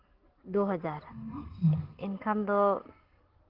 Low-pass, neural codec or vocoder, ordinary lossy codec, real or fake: 5.4 kHz; none; Opus, 16 kbps; real